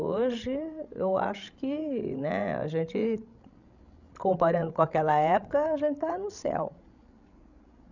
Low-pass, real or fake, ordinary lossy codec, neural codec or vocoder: 7.2 kHz; fake; none; codec, 16 kHz, 16 kbps, FreqCodec, larger model